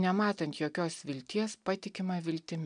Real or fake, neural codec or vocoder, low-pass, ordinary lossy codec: real; none; 9.9 kHz; MP3, 96 kbps